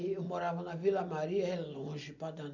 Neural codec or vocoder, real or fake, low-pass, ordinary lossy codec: none; real; 7.2 kHz; none